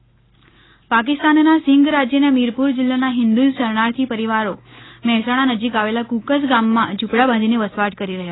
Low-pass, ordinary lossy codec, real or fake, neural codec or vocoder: 7.2 kHz; AAC, 16 kbps; real; none